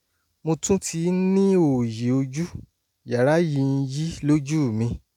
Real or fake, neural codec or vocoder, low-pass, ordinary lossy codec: real; none; 19.8 kHz; none